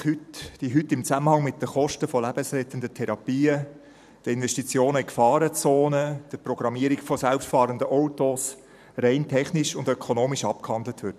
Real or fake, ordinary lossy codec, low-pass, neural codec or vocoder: fake; none; 14.4 kHz; vocoder, 44.1 kHz, 128 mel bands every 512 samples, BigVGAN v2